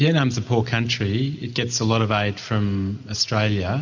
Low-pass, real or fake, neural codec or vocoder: 7.2 kHz; real; none